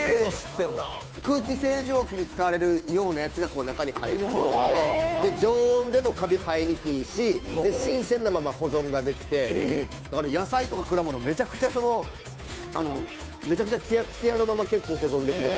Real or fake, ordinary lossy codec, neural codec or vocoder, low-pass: fake; none; codec, 16 kHz, 2 kbps, FunCodec, trained on Chinese and English, 25 frames a second; none